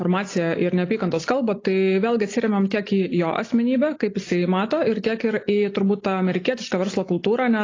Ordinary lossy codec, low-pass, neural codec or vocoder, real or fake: AAC, 32 kbps; 7.2 kHz; none; real